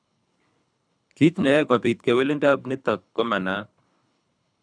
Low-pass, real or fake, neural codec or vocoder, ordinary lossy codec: 9.9 kHz; fake; codec, 24 kHz, 3 kbps, HILCodec; none